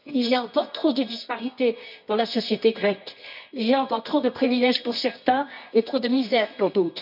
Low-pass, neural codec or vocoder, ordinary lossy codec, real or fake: 5.4 kHz; codec, 24 kHz, 0.9 kbps, WavTokenizer, medium music audio release; none; fake